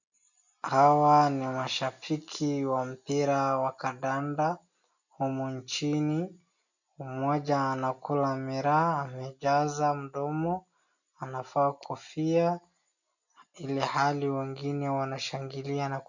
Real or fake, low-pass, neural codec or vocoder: real; 7.2 kHz; none